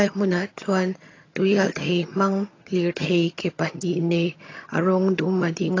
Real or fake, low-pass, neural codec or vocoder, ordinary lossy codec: fake; 7.2 kHz; vocoder, 22.05 kHz, 80 mel bands, HiFi-GAN; AAC, 32 kbps